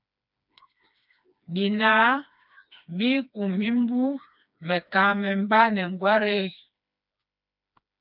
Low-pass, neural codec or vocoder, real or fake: 5.4 kHz; codec, 16 kHz, 2 kbps, FreqCodec, smaller model; fake